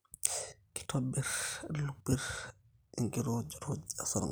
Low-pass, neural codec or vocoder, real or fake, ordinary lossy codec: none; none; real; none